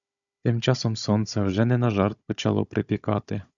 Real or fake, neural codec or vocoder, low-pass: fake; codec, 16 kHz, 16 kbps, FunCodec, trained on Chinese and English, 50 frames a second; 7.2 kHz